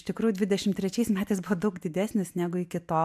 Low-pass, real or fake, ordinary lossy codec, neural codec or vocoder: 14.4 kHz; real; MP3, 96 kbps; none